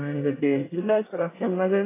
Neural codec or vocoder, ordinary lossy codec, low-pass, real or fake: codec, 24 kHz, 1 kbps, SNAC; AAC, 16 kbps; 3.6 kHz; fake